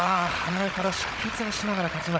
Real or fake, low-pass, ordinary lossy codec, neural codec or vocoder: fake; none; none; codec, 16 kHz, 16 kbps, FunCodec, trained on Chinese and English, 50 frames a second